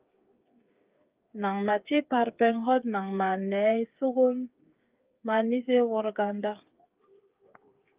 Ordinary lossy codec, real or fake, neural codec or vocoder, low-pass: Opus, 32 kbps; fake; codec, 16 kHz, 8 kbps, FreqCodec, smaller model; 3.6 kHz